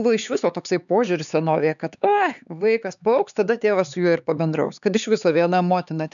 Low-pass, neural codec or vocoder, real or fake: 7.2 kHz; codec, 16 kHz, 4 kbps, X-Codec, HuBERT features, trained on LibriSpeech; fake